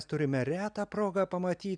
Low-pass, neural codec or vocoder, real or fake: 9.9 kHz; none; real